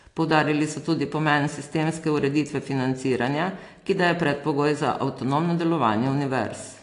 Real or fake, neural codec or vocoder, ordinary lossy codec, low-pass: real; none; AAC, 48 kbps; 10.8 kHz